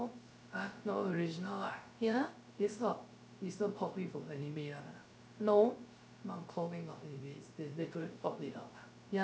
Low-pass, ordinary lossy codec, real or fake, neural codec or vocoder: none; none; fake; codec, 16 kHz, 0.3 kbps, FocalCodec